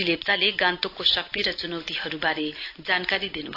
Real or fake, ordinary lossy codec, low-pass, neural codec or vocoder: real; AAC, 32 kbps; 5.4 kHz; none